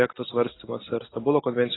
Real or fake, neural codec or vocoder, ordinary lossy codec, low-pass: real; none; AAC, 16 kbps; 7.2 kHz